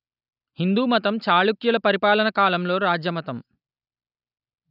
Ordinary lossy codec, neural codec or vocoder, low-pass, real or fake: none; none; 5.4 kHz; real